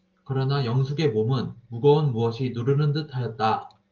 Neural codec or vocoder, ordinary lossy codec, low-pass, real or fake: none; Opus, 24 kbps; 7.2 kHz; real